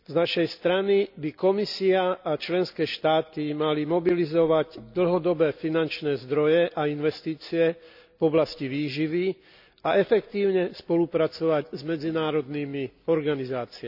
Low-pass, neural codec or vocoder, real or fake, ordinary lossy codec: 5.4 kHz; none; real; none